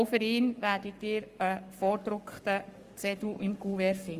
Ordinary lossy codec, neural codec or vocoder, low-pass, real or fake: Opus, 32 kbps; codec, 44.1 kHz, 7.8 kbps, Pupu-Codec; 14.4 kHz; fake